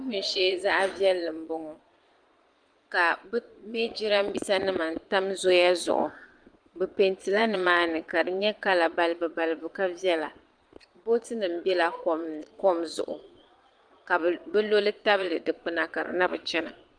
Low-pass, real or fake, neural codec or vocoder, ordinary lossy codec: 9.9 kHz; fake; vocoder, 22.05 kHz, 80 mel bands, Vocos; Opus, 32 kbps